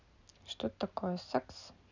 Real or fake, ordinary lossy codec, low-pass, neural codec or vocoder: real; none; 7.2 kHz; none